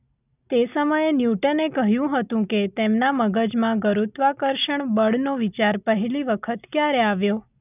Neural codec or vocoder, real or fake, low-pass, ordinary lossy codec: none; real; 3.6 kHz; none